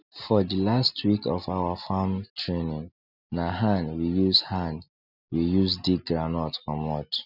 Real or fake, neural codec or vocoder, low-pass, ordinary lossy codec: real; none; 5.4 kHz; none